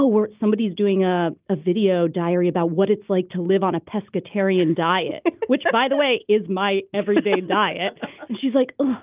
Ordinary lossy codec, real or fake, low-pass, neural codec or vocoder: Opus, 24 kbps; real; 3.6 kHz; none